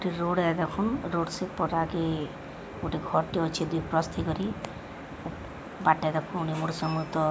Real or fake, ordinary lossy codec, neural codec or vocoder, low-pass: real; none; none; none